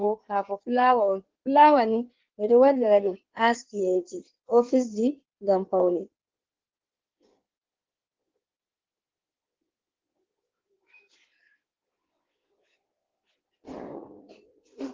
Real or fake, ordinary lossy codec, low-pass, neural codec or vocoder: fake; Opus, 16 kbps; 7.2 kHz; codec, 16 kHz in and 24 kHz out, 1.1 kbps, FireRedTTS-2 codec